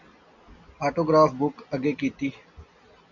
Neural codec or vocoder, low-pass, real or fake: none; 7.2 kHz; real